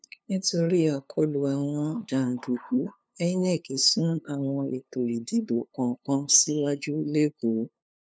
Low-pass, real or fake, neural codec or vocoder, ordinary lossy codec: none; fake; codec, 16 kHz, 2 kbps, FunCodec, trained on LibriTTS, 25 frames a second; none